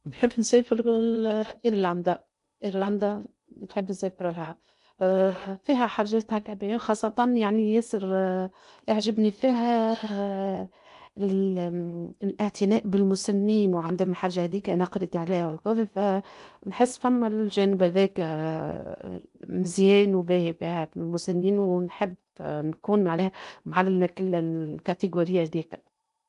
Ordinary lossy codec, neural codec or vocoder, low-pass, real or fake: AAC, 96 kbps; codec, 16 kHz in and 24 kHz out, 0.8 kbps, FocalCodec, streaming, 65536 codes; 10.8 kHz; fake